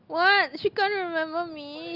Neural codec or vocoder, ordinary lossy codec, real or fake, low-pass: none; Opus, 24 kbps; real; 5.4 kHz